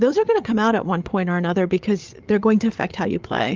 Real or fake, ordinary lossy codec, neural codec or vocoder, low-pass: fake; Opus, 32 kbps; codec, 16 kHz, 8 kbps, FreqCodec, larger model; 7.2 kHz